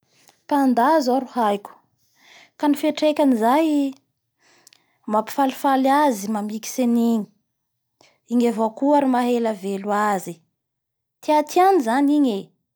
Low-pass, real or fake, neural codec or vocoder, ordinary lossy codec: none; real; none; none